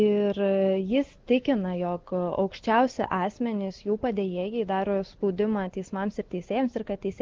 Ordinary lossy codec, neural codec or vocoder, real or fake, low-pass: Opus, 16 kbps; none; real; 7.2 kHz